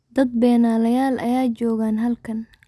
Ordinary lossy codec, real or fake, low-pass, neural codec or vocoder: none; real; none; none